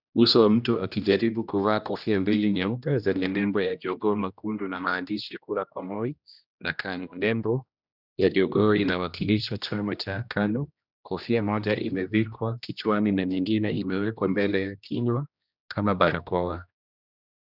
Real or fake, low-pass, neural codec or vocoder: fake; 5.4 kHz; codec, 16 kHz, 1 kbps, X-Codec, HuBERT features, trained on general audio